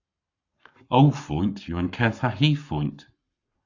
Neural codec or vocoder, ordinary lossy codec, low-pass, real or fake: codec, 44.1 kHz, 7.8 kbps, Pupu-Codec; Opus, 64 kbps; 7.2 kHz; fake